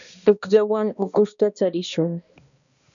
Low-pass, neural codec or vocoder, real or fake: 7.2 kHz; codec, 16 kHz, 1 kbps, X-Codec, HuBERT features, trained on balanced general audio; fake